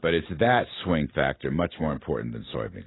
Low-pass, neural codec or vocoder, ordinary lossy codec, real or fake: 7.2 kHz; none; AAC, 16 kbps; real